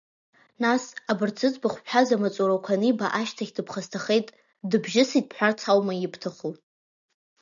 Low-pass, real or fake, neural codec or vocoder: 7.2 kHz; real; none